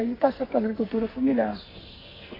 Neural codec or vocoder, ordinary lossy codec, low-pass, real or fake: codec, 44.1 kHz, 2.6 kbps, SNAC; AAC, 24 kbps; 5.4 kHz; fake